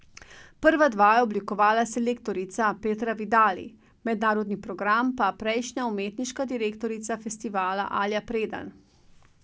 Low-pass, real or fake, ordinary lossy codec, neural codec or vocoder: none; real; none; none